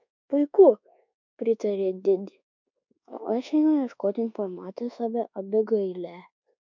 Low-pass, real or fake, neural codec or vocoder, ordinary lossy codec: 7.2 kHz; fake; codec, 24 kHz, 1.2 kbps, DualCodec; MP3, 64 kbps